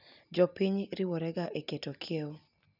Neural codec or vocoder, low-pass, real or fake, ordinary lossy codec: none; 5.4 kHz; real; none